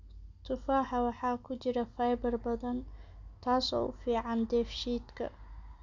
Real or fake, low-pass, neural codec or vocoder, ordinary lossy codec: real; 7.2 kHz; none; none